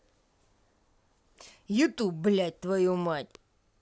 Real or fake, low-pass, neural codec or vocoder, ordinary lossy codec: real; none; none; none